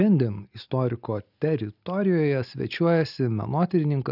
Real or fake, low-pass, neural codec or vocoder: real; 5.4 kHz; none